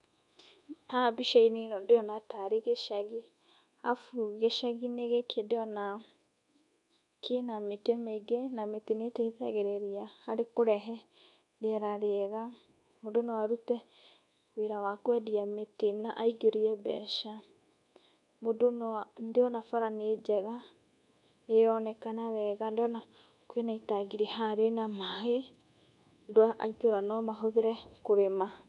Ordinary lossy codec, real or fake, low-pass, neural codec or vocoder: none; fake; 10.8 kHz; codec, 24 kHz, 1.2 kbps, DualCodec